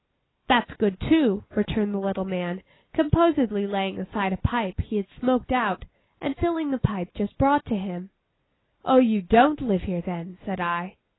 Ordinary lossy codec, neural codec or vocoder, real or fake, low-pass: AAC, 16 kbps; none; real; 7.2 kHz